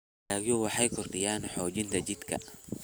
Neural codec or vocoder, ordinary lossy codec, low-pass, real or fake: none; none; none; real